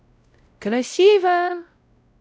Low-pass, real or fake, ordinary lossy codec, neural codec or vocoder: none; fake; none; codec, 16 kHz, 0.5 kbps, X-Codec, WavLM features, trained on Multilingual LibriSpeech